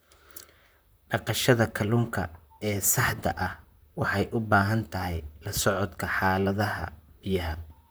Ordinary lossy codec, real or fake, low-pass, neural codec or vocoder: none; fake; none; vocoder, 44.1 kHz, 128 mel bands, Pupu-Vocoder